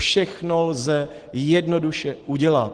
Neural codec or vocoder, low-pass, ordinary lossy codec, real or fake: none; 9.9 kHz; Opus, 16 kbps; real